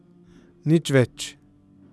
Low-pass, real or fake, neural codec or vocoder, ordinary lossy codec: none; real; none; none